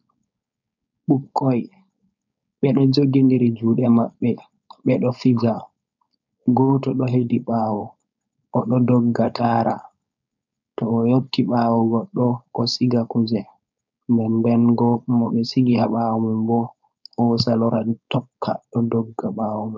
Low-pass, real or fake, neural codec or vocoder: 7.2 kHz; fake; codec, 16 kHz, 4.8 kbps, FACodec